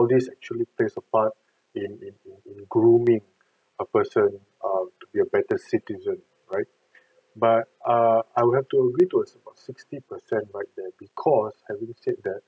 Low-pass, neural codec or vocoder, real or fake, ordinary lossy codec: none; none; real; none